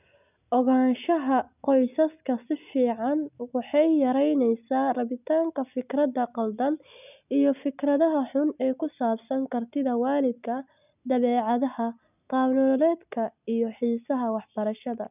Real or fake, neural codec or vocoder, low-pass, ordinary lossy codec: real; none; 3.6 kHz; none